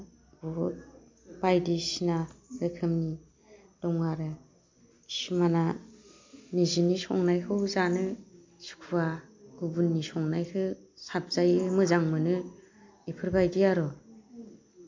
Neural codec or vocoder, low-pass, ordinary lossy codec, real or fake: none; 7.2 kHz; MP3, 48 kbps; real